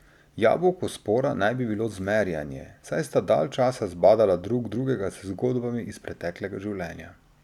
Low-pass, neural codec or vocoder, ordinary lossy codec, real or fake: 19.8 kHz; none; none; real